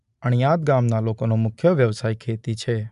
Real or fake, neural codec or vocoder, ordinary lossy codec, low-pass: real; none; AAC, 96 kbps; 10.8 kHz